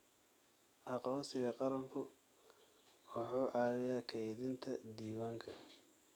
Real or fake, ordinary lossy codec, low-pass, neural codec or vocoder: fake; none; none; codec, 44.1 kHz, 7.8 kbps, DAC